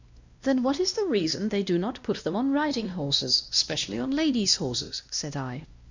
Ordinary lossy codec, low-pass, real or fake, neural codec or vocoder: Opus, 64 kbps; 7.2 kHz; fake; codec, 16 kHz, 1 kbps, X-Codec, WavLM features, trained on Multilingual LibriSpeech